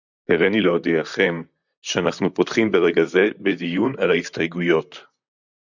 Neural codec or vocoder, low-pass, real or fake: vocoder, 44.1 kHz, 128 mel bands, Pupu-Vocoder; 7.2 kHz; fake